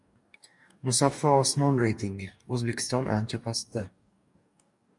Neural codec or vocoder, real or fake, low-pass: codec, 44.1 kHz, 2.6 kbps, DAC; fake; 10.8 kHz